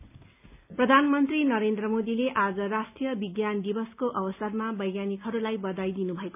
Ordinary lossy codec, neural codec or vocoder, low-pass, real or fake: none; none; 3.6 kHz; real